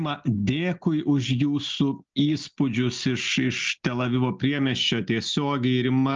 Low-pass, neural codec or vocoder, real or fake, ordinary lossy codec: 7.2 kHz; none; real; Opus, 24 kbps